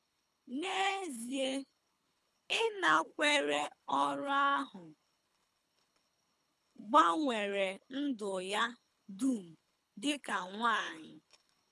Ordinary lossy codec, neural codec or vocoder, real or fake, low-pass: none; codec, 24 kHz, 3 kbps, HILCodec; fake; none